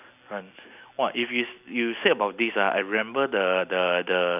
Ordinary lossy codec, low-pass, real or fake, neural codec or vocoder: none; 3.6 kHz; real; none